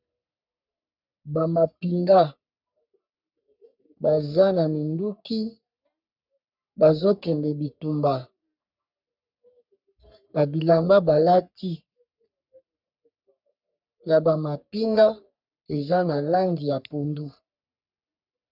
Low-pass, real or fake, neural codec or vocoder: 5.4 kHz; fake; codec, 44.1 kHz, 3.4 kbps, Pupu-Codec